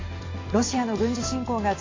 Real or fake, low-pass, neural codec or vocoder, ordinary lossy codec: real; 7.2 kHz; none; AAC, 32 kbps